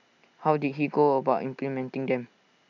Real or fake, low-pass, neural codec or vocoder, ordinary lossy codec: real; 7.2 kHz; none; none